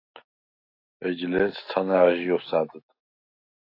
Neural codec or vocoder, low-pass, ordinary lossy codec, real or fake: none; 5.4 kHz; AAC, 32 kbps; real